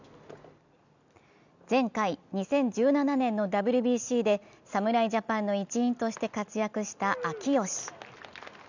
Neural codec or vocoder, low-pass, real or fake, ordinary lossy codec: none; 7.2 kHz; real; none